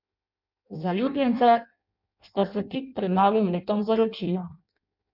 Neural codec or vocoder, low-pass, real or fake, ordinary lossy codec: codec, 16 kHz in and 24 kHz out, 0.6 kbps, FireRedTTS-2 codec; 5.4 kHz; fake; none